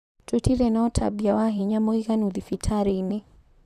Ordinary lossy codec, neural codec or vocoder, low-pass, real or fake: none; vocoder, 44.1 kHz, 128 mel bands, Pupu-Vocoder; 14.4 kHz; fake